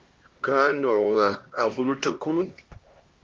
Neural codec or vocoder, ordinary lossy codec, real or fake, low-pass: codec, 16 kHz, 1 kbps, X-Codec, HuBERT features, trained on LibriSpeech; Opus, 24 kbps; fake; 7.2 kHz